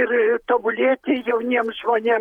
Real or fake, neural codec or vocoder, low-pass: fake; vocoder, 48 kHz, 128 mel bands, Vocos; 19.8 kHz